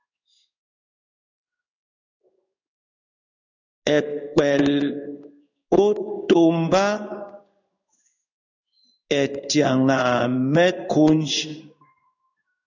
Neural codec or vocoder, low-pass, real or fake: codec, 16 kHz in and 24 kHz out, 1 kbps, XY-Tokenizer; 7.2 kHz; fake